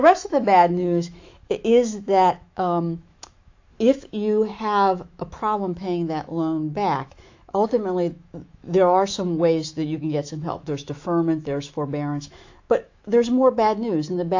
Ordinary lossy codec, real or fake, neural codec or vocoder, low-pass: AAC, 48 kbps; fake; autoencoder, 48 kHz, 128 numbers a frame, DAC-VAE, trained on Japanese speech; 7.2 kHz